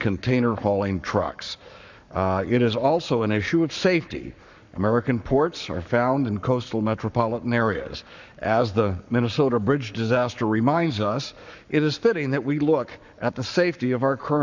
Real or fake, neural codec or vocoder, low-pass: fake; codec, 44.1 kHz, 7.8 kbps, Pupu-Codec; 7.2 kHz